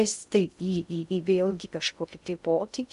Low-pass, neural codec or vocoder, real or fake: 10.8 kHz; codec, 16 kHz in and 24 kHz out, 0.6 kbps, FocalCodec, streaming, 2048 codes; fake